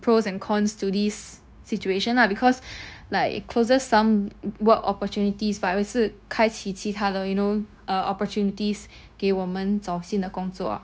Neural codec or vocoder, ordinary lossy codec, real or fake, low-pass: codec, 16 kHz, 0.9 kbps, LongCat-Audio-Codec; none; fake; none